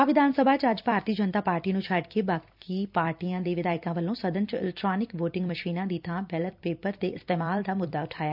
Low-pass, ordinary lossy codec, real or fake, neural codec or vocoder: 5.4 kHz; none; fake; vocoder, 44.1 kHz, 128 mel bands every 256 samples, BigVGAN v2